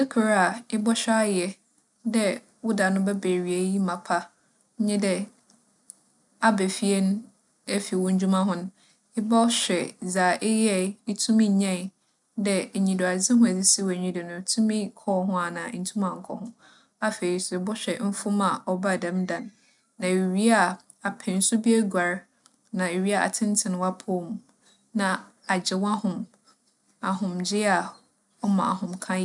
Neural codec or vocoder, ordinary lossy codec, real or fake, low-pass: none; none; real; 10.8 kHz